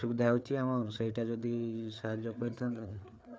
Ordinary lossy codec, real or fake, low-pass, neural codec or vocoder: none; fake; none; codec, 16 kHz, 4 kbps, FreqCodec, larger model